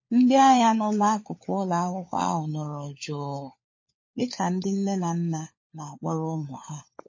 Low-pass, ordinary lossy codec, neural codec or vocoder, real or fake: 7.2 kHz; MP3, 32 kbps; codec, 16 kHz, 4 kbps, FunCodec, trained on LibriTTS, 50 frames a second; fake